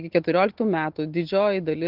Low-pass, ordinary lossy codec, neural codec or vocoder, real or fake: 5.4 kHz; Opus, 16 kbps; none; real